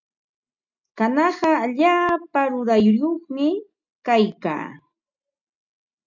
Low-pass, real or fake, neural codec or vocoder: 7.2 kHz; real; none